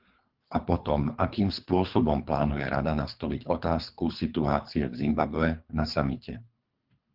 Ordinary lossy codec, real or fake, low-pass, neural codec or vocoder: Opus, 24 kbps; fake; 5.4 kHz; codec, 24 kHz, 3 kbps, HILCodec